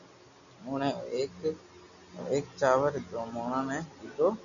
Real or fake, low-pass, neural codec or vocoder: real; 7.2 kHz; none